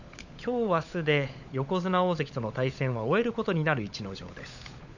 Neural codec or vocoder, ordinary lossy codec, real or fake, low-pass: codec, 16 kHz, 16 kbps, FunCodec, trained on LibriTTS, 50 frames a second; none; fake; 7.2 kHz